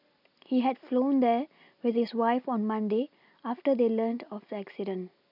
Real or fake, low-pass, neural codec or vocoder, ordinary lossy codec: real; 5.4 kHz; none; none